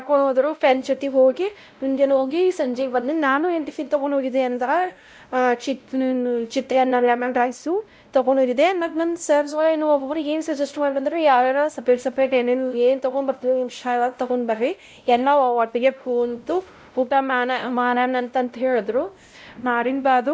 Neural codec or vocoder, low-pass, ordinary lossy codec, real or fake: codec, 16 kHz, 0.5 kbps, X-Codec, WavLM features, trained on Multilingual LibriSpeech; none; none; fake